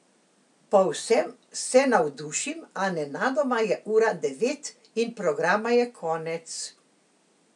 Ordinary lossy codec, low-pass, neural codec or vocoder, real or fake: none; 10.8 kHz; none; real